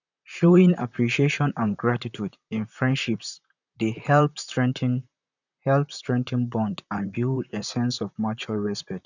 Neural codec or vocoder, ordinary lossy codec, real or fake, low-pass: vocoder, 44.1 kHz, 128 mel bands, Pupu-Vocoder; none; fake; 7.2 kHz